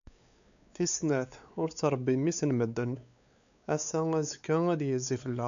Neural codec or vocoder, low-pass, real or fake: codec, 16 kHz, 4 kbps, X-Codec, WavLM features, trained on Multilingual LibriSpeech; 7.2 kHz; fake